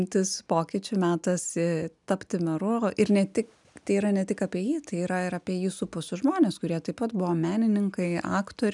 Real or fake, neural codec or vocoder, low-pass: real; none; 10.8 kHz